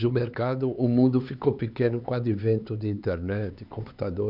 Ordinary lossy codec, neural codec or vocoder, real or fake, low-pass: none; codec, 16 kHz, 4 kbps, X-Codec, HuBERT features, trained on LibriSpeech; fake; 5.4 kHz